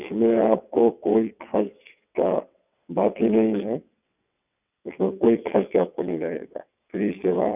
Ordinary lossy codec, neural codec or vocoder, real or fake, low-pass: none; vocoder, 22.05 kHz, 80 mel bands, WaveNeXt; fake; 3.6 kHz